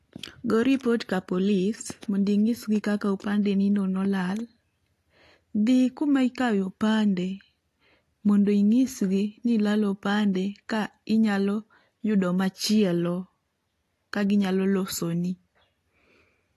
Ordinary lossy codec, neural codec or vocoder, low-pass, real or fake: AAC, 48 kbps; none; 14.4 kHz; real